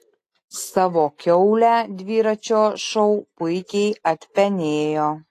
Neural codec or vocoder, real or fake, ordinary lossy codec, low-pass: none; real; AAC, 48 kbps; 14.4 kHz